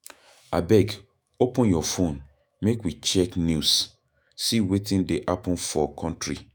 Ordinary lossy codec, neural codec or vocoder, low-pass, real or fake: none; autoencoder, 48 kHz, 128 numbers a frame, DAC-VAE, trained on Japanese speech; none; fake